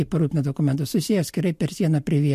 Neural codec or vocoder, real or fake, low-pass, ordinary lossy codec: none; real; 14.4 kHz; MP3, 64 kbps